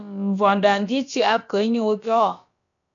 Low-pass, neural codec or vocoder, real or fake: 7.2 kHz; codec, 16 kHz, about 1 kbps, DyCAST, with the encoder's durations; fake